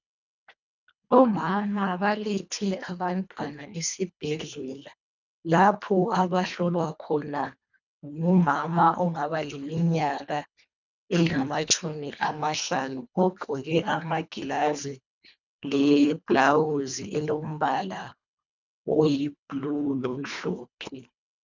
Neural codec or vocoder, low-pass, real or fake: codec, 24 kHz, 1.5 kbps, HILCodec; 7.2 kHz; fake